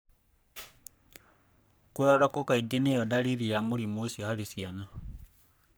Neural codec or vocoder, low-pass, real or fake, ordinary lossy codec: codec, 44.1 kHz, 3.4 kbps, Pupu-Codec; none; fake; none